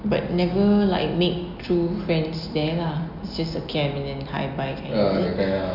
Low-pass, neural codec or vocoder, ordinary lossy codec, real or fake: 5.4 kHz; none; none; real